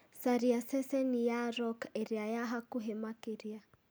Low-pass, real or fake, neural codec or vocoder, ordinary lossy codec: none; real; none; none